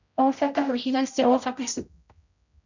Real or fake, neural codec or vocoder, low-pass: fake; codec, 16 kHz, 0.5 kbps, X-Codec, HuBERT features, trained on general audio; 7.2 kHz